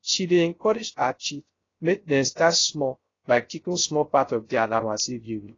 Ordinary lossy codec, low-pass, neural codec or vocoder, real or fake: AAC, 32 kbps; 7.2 kHz; codec, 16 kHz, 0.3 kbps, FocalCodec; fake